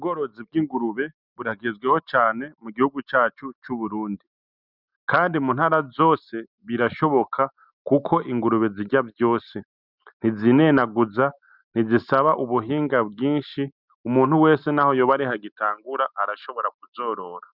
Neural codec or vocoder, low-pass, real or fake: none; 5.4 kHz; real